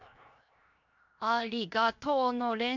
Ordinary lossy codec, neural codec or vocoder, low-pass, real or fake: none; codec, 16 kHz, 0.7 kbps, FocalCodec; 7.2 kHz; fake